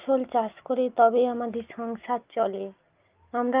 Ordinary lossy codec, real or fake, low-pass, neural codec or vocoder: Opus, 32 kbps; real; 3.6 kHz; none